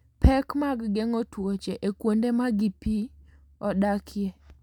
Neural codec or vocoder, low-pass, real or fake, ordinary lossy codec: none; 19.8 kHz; real; none